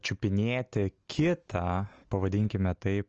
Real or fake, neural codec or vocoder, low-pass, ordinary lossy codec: real; none; 7.2 kHz; Opus, 24 kbps